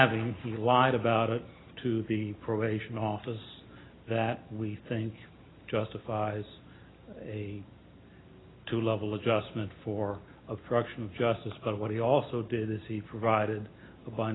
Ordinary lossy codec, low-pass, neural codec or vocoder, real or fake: AAC, 16 kbps; 7.2 kHz; none; real